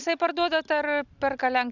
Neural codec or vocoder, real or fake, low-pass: none; real; 7.2 kHz